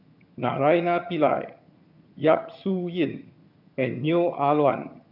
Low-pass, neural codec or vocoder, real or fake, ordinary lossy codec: 5.4 kHz; vocoder, 22.05 kHz, 80 mel bands, HiFi-GAN; fake; none